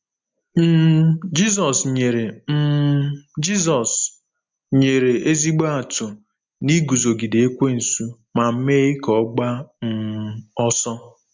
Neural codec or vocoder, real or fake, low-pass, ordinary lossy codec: none; real; 7.2 kHz; MP3, 64 kbps